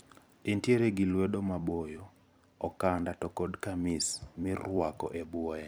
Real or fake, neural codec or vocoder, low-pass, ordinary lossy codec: fake; vocoder, 44.1 kHz, 128 mel bands every 256 samples, BigVGAN v2; none; none